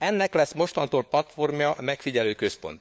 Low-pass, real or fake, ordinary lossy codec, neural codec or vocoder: none; fake; none; codec, 16 kHz, 4 kbps, FunCodec, trained on LibriTTS, 50 frames a second